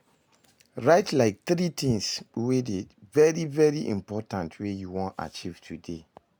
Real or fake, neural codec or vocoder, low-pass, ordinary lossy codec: real; none; none; none